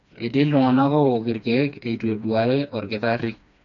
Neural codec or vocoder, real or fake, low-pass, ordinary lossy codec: codec, 16 kHz, 2 kbps, FreqCodec, smaller model; fake; 7.2 kHz; none